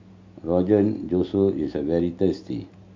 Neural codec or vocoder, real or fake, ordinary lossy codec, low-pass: none; real; MP3, 48 kbps; 7.2 kHz